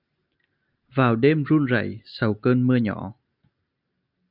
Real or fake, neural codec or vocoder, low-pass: real; none; 5.4 kHz